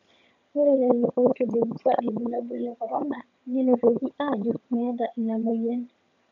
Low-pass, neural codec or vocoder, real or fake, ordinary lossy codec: 7.2 kHz; vocoder, 22.05 kHz, 80 mel bands, HiFi-GAN; fake; none